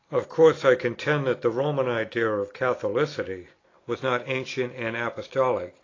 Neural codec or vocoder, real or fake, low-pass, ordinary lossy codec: none; real; 7.2 kHz; AAC, 32 kbps